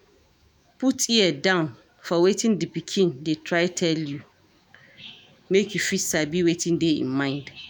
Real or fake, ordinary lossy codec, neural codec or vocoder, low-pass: fake; none; autoencoder, 48 kHz, 128 numbers a frame, DAC-VAE, trained on Japanese speech; none